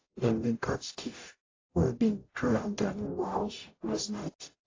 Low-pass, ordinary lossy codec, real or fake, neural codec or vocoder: 7.2 kHz; AAC, 32 kbps; fake; codec, 44.1 kHz, 0.9 kbps, DAC